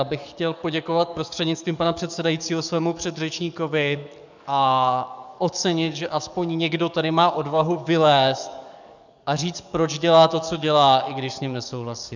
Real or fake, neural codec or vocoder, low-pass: fake; codec, 44.1 kHz, 7.8 kbps, DAC; 7.2 kHz